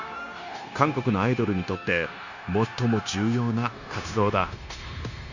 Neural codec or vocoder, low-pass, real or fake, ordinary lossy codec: codec, 16 kHz, 0.9 kbps, LongCat-Audio-Codec; 7.2 kHz; fake; none